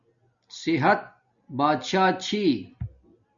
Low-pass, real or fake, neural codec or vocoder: 7.2 kHz; real; none